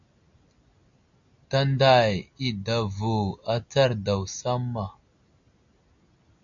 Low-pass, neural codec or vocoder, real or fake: 7.2 kHz; none; real